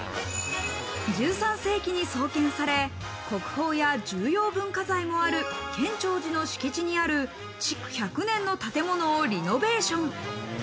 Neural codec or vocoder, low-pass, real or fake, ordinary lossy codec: none; none; real; none